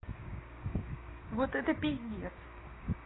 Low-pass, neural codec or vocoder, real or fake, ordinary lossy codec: 7.2 kHz; codec, 16 kHz, 6 kbps, DAC; fake; AAC, 16 kbps